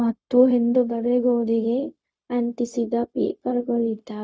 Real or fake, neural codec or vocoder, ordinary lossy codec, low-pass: fake; codec, 16 kHz, 0.4 kbps, LongCat-Audio-Codec; none; none